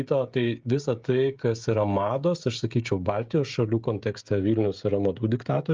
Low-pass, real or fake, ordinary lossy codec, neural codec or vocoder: 7.2 kHz; fake; Opus, 32 kbps; codec, 16 kHz, 16 kbps, FreqCodec, smaller model